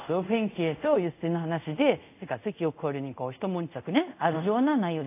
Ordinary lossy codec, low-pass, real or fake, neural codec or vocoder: AAC, 32 kbps; 3.6 kHz; fake; codec, 24 kHz, 0.5 kbps, DualCodec